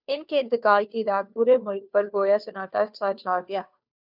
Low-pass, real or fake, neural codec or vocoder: 5.4 kHz; fake; codec, 16 kHz, 2 kbps, FunCodec, trained on Chinese and English, 25 frames a second